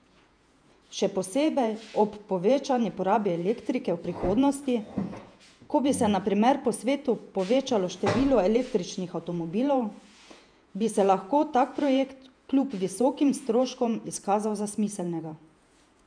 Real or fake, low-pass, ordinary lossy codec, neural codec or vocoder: fake; 9.9 kHz; none; vocoder, 48 kHz, 128 mel bands, Vocos